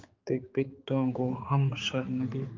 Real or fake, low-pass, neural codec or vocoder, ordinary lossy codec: fake; 7.2 kHz; codec, 16 kHz, 4 kbps, X-Codec, HuBERT features, trained on general audio; Opus, 24 kbps